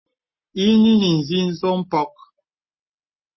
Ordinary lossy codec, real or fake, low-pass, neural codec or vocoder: MP3, 24 kbps; real; 7.2 kHz; none